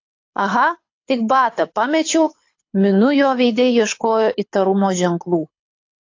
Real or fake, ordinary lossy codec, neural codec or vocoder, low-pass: fake; AAC, 48 kbps; codec, 24 kHz, 6 kbps, HILCodec; 7.2 kHz